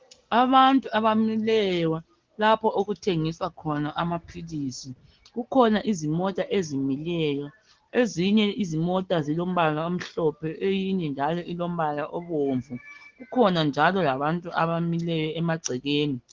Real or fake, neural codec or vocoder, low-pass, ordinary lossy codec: real; none; 7.2 kHz; Opus, 16 kbps